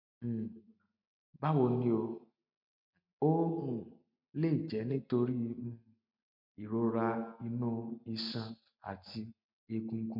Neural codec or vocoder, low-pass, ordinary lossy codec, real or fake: none; 5.4 kHz; MP3, 48 kbps; real